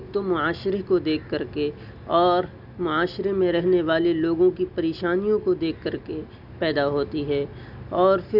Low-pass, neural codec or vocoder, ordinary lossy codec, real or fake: 5.4 kHz; none; none; real